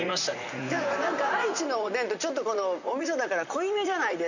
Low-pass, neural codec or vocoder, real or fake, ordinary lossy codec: 7.2 kHz; vocoder, 44.1 kHz, 128 mel bands, Pupu-Vocoder; fake; none